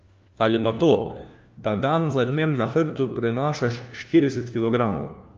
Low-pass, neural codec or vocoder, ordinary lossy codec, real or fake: 7.2 kHz; codec, 16 kHz, 1 kbps, FunCodec, trained on LibriTTS, 50 frames a second; Opus, 24 kbps; fake